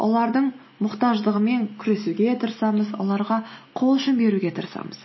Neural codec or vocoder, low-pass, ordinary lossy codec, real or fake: none; 7.2 kHz; MP3, 24 kbps; real